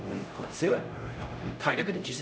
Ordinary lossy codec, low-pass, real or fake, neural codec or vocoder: none; none; fake; codec, 16 kHz, 0.5 kbps, X-Codec, HuBERT features, trained on LibriSpeech